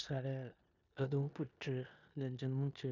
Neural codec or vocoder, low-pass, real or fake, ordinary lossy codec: codec, 16 kHz in and 24 kHz out, 0.9 kbps, LongCat-Audio-Codec, four codebook decoder; 7.2 kHz; fake; none